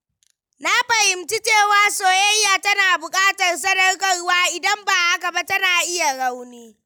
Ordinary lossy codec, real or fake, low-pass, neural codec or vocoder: none; real; none; none